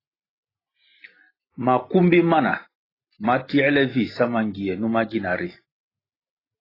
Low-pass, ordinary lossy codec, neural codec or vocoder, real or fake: 5.4 kHz; AAC, 24 kbps; none; real